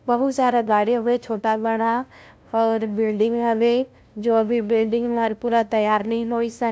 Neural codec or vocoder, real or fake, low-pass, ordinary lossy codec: codec, 16 kHz, 0.5 kbps, FunCodec, trained on LibriTTS, 25 frames a second; fake; none; none